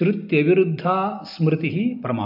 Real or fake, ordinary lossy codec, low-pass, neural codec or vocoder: real; none; 5.4 kHz; none